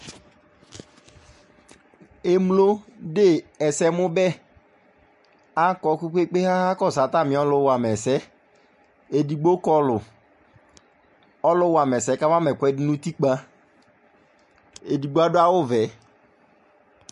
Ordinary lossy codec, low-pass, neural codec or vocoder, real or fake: MP3, 48 kbps; 14.4 kHz; none; real